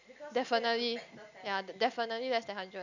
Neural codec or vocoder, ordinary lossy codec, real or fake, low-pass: none; none; real; 7.2 kHz